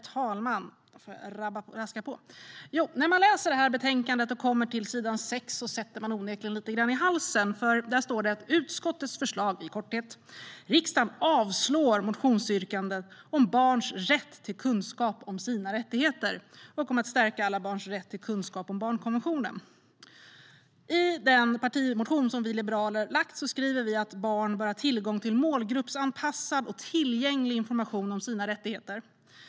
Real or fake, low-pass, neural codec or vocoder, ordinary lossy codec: real; none; none; none